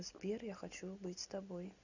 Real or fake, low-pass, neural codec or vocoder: real; 7.2 kHz; none